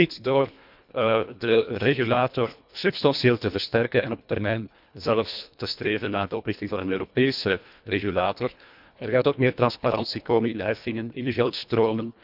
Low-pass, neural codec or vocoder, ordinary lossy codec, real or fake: 5.4 kHz; codec, 24 kHz, 1.5 kbps, HILCodec; none; fake